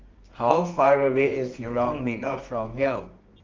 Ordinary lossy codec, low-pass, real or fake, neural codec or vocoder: Opus, 32 kbps; 7.2 kHz; fake; codec, 24 kHz, 0.9 kbps, WavTokenizer, medium music audio release